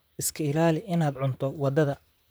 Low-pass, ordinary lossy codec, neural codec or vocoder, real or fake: none; none; vocoder, 44.1 kHz, 128 mel bands, Pupu-Vocoder; fake